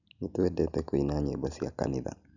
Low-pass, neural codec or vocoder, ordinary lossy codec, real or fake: 7.2 kHz; none; none; real